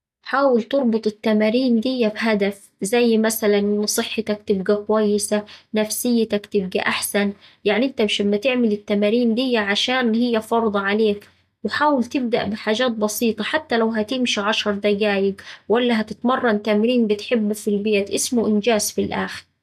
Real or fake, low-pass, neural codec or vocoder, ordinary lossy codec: real; 14.4 kHz; none; none